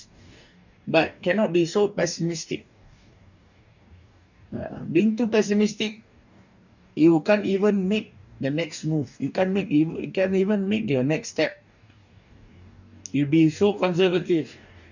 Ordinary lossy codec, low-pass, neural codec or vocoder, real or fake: none; 7.2 kHz; codec, 44.1 kHz, 2.6 kbps, DAC; fake